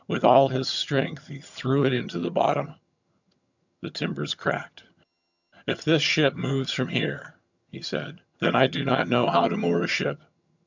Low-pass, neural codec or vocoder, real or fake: 7.2 kHz; vocoder, 22.05 kHz, 80 mel bands, HiFi-GAN; fake